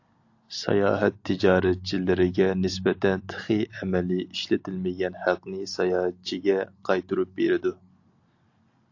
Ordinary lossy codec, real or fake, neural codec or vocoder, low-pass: AAC, 48 kbps; real; none; 7.2 kHz